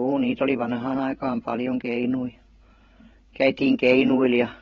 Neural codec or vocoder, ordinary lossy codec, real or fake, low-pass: codec, 16 kHz, 8 kbps, FreqCodec, larger model; AAC, 24 kbps; fake; 7.2 kHz